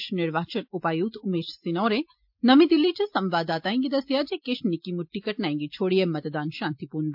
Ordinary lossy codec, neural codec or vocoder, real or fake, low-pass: MP3, 48 kbps; none; real; 5.4 kHz